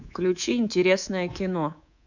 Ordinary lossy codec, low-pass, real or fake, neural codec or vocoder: none; 7.2 kHz; fake; codec, 24 kHz, 3.1 kbps, DualCodec